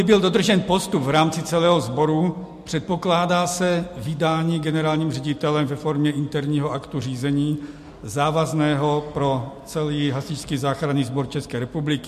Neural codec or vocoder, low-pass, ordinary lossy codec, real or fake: none; 14.4 kHz; MP3, 64 kbps; real